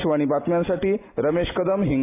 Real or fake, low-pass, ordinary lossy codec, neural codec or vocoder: real; 3.6 kHz; AAC, 16 kbps; none